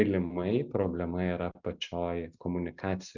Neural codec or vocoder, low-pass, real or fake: none; 7.2 kHz; real